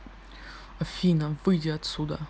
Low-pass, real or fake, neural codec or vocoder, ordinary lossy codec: none; real; none; none